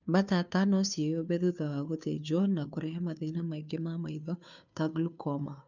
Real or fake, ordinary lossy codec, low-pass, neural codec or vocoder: fake; none; 7.2 kHz; codec, 16 kHz, 2 kbps, FunCodec, trained on Chinese and English, 25 frames a second